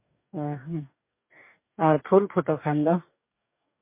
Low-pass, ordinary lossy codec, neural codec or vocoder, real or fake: 3.6 kHz; MP3, 24 kbps; codec, 44.1 kHz, 2.6 kbps, DAC; fake